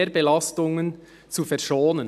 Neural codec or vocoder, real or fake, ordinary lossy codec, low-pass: none; real; none; 14.4 kHz